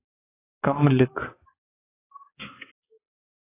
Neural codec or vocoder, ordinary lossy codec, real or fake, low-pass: codec, 16 kHz, 4 kbps, X-Codec, HuBERT features, trained on balanced general audio; AAC, 16 kbps; fake; 3.6 kHz